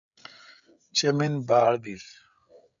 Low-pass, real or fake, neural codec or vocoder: 7.2 kHz; fake; codec, 16 kHz, 16 kbps, FreqCodec, smaller model